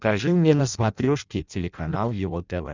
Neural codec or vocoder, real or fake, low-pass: codec, 16 kHz in and 24 kHz out, 0.6 kbps, FireRedTTS-2 codec; fake; 7.2 kHz